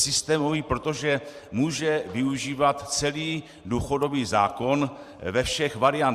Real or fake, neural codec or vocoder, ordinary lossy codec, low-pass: fake; vocoder, 44.1 kHz, 128 mel bands every 512 samples, BigVGAN v2; Opus, 64 kbps; 14.4 kHz